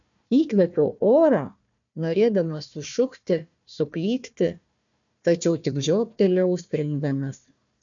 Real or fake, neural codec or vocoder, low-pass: fake; codec, 16 kHz, 1 kbps, FunCodec, trained on Chinese and English, 50 frames a second; 7.2 kHz